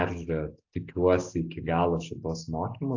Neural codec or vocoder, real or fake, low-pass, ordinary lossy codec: none; real; 7.2 kHz; AAC, 48 kbps